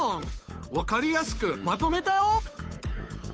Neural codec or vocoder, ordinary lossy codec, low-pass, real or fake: codec, 16 kHz, 8 kbps, FunCodec, trained on Chinese and English, 25 frames a second; none; none; fake